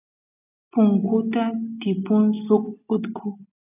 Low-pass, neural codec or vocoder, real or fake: 3.6 kHz; none; real